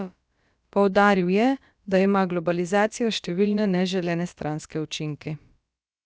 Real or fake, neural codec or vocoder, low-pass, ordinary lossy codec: fake; codec, 16 kHz, about 1 kbps, DyCAST, with the encoder's durations; none; none